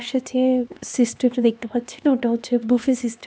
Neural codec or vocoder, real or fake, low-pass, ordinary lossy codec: codec, 16 kHz, 2 kbps, X-Codec, HuBERT features, trained on LibriSpeech; fake; none; none